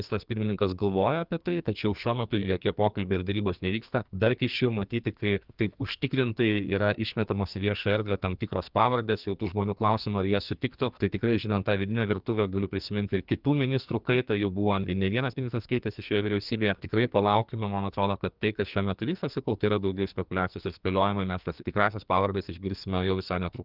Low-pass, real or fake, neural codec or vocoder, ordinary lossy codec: 5.4 kHz; fake; codec, 44.1 kHz, 2.6 kbps, SNAC; Opus, 32 kbps